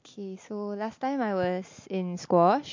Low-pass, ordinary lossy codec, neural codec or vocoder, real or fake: 7.2 kHz; MP3, 48 kbps; none; real